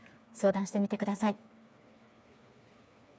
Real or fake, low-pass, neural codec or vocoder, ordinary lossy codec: fake; none; codec, 16 kHz, 4 kbps, FreqCodec, smaller model; none